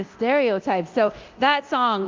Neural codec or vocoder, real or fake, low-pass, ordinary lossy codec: codec, 24 kHz, 0.9 kbps, DualCodec; fake; 7.2 kHz; Opus, 32 kbps